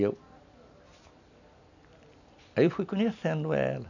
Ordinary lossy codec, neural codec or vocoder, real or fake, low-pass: none; none; real; 7.2 kHz